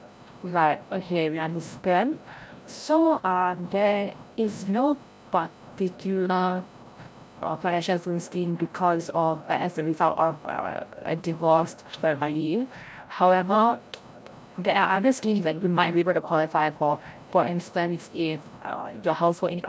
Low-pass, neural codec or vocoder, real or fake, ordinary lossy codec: none; codec, 16 kHz, 0.5 kbps, FreqCodec, larger model; fake; none